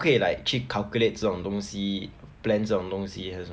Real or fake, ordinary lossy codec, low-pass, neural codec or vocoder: real; none; none; none